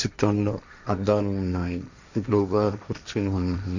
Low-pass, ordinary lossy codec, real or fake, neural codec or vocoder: 7.2 kHz; none; fake; codec, 16 kHz, 1.1 kbps, Voila-Tokenizer